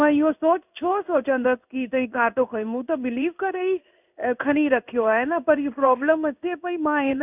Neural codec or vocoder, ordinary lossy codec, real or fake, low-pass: codec, 16 kHz in and 24 kHz out, 1 kbps, XY-Tokenizer; none; fake; 3.6 kHz